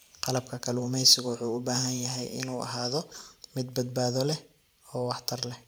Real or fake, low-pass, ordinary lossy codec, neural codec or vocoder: fake; none; none; vocoder, 44.1 kHz, 128 mel bands every 512 samples, BigVGAN v2